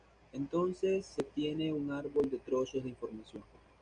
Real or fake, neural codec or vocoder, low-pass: real; none; 9.9 kHz